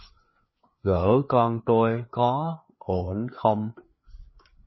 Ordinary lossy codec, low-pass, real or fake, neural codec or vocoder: MP3, 24 kbps; 7.2 kHz; fake; codec, 16 kHz, 4 kbps, FreqCodec, larger model